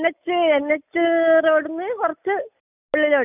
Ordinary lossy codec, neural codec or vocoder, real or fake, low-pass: none; none; real; 3.6 kHz